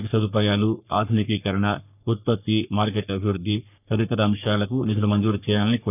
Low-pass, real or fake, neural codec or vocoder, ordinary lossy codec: 3.6 kHz; fake; codec, 44.1 kHz, 3.4 kbps, Pupu-Codec; none